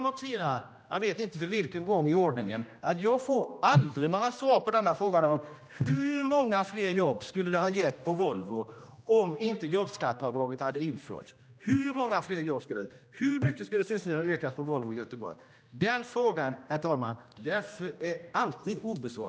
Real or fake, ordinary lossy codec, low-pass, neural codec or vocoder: fake; none; none; codec, 16 kHz, 1 kbps, X-Codec, HuBERT features, trained on general audio